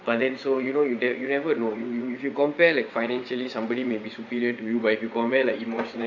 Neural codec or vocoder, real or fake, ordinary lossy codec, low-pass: vocoder, 22.05 kHz, 80 mel bands, WaveNeXt; fake; none; 7.2 kHz